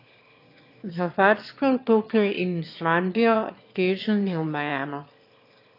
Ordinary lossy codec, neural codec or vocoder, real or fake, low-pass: AAC, 32 kbps; autoencoder, 22.05 kHz, a latent of 192 numbers a frame, VITS, trained on one speaker; fake; 5.4 kHz